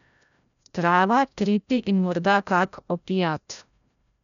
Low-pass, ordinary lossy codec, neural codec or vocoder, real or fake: 7.2 kHz; none; codec, 16 kHz, 0.5 kbps, FreqCodec, larger model; fake